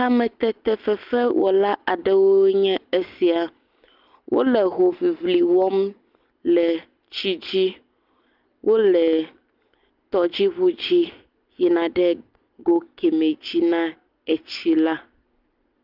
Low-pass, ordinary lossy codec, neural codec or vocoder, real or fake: 5.4 kHz; Opus, 32 kbps; none; real